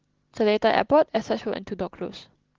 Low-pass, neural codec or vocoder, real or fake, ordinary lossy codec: 7.2 kHz; none; real; Opus, 16 kbps